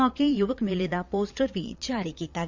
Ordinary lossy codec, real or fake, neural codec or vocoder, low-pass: MP3, 64 kbps; fake; vocoder, 22.05 kHz, 80 mel bands, Vocos; 7.2 kHz